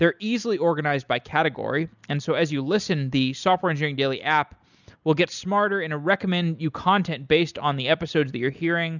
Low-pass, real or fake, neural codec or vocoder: 7.2 kHz; real; none